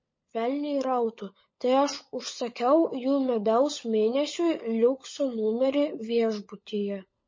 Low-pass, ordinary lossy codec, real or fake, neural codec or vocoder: 7.2 kHz; MP3, 32 kbps; fake; codec, 16 kHz, 16 kbps, FunCodec, trained on LibriTTS, 50 frames a second